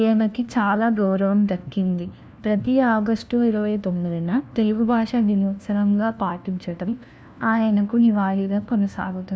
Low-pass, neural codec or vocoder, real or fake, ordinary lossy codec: none; codec, 16 kHz, 1 kbps, FunCodec, trained on LibriTTS, 50 frames a second; fake; none